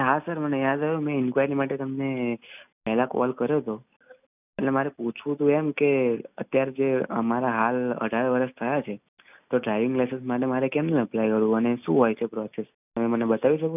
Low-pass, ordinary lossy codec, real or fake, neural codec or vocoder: 3.6 kHz; none; real; none